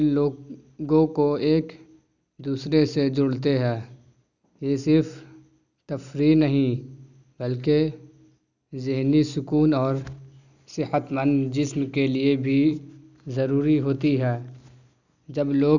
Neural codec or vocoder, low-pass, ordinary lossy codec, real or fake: none; 7.2 kHz; none; real